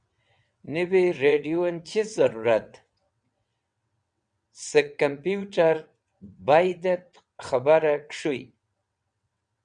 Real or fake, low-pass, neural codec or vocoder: fake; 9.9 kHz; vocoder, 22.05 kHz, 80 mel bands, WaveNeXt